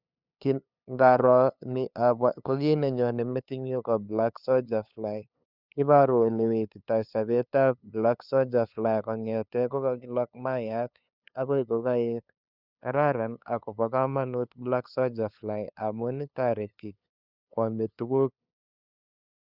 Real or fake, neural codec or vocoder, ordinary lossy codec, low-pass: fake; codec, 16 kHz, 2 kbps, FunCodec, trained on LibriTTS, 25 frames a second; Opus, 64 kbps; 5.4 kHz